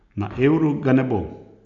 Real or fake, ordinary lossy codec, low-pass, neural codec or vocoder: real; none; 7.2 kHz; none